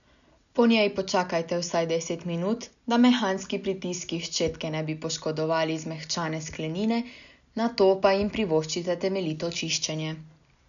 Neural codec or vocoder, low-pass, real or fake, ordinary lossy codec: none; 7.2 kHz; real; none